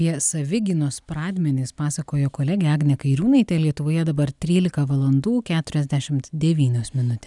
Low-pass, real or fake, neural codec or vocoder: 10.8 kHz; real; none